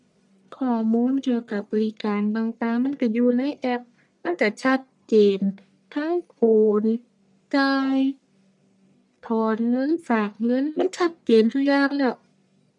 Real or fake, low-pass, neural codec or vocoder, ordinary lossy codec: fake; 10.8 kHz; codec, 44.1 kHz, 1.7 kbps, Pupu-Codec; none